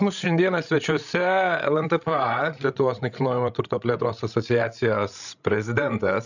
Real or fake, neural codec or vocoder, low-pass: fake; codec, 16 kHz, 16 kbps, FreqCodec, larger model; 7.2 kHz